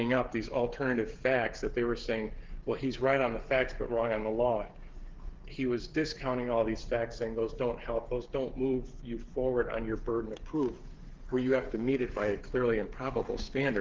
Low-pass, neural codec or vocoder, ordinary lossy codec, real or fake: 7.2 kHz; codec, 16 kHz, 8 kbps, FreqCodec, smaller model; Opus, 16 kbps; fake